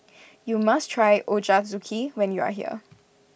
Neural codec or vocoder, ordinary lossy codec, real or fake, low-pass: none; none; real; none